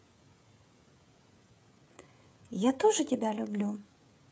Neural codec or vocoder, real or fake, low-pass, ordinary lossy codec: codec, 16 kHz, 16 kbps, FreqCodec, smaller model; fake; none; none